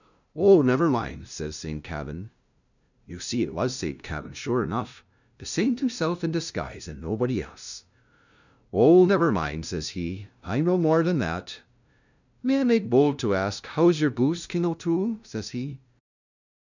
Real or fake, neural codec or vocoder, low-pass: fake; codec, 16 kHz, 0.5 kbps, FunCodec, trained on LibriTTS, 25 frames a second; 7.2 kHz